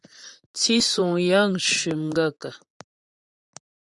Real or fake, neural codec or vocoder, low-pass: fake; vocoder, 44.1 kHz, 128 mel bands, Pupu-Vocoder; 10.8 kHz